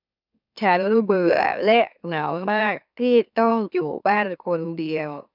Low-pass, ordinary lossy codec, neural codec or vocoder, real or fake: 5.4 kHz; none; autoencoder, 44.1 kHz, a latent of 192 numbers a frame, MeloTTS; fake